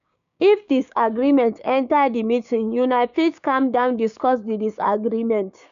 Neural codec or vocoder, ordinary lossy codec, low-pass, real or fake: codec, 16 kHz, 6 kbps, DAC; none; 7.2 kHz; fake